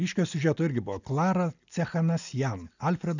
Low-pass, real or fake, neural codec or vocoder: 7.2 kHz; real; none